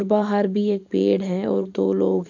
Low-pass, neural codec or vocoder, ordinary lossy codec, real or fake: 7.2 kHz; vocoder, 44.1 kHz, 128 mel bands every 512 samples, BigVGAN v2; none; fake